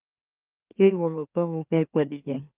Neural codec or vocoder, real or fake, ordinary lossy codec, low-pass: autoencoder, 44.1 kHz, a latent of 192 numbers a frame, MeloTTS; fake; Opus, 24 kbps; 3.6 kHz